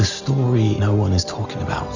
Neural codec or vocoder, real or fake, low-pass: none; real; 7.2 kHz